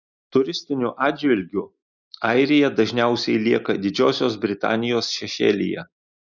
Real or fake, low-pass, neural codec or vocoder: real; 7.2 kHz; none